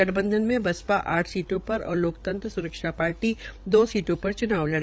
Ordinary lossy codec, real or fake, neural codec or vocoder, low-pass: none; fake; codec, 16 kHz, 8 kbps, FreqCodec, larger model; none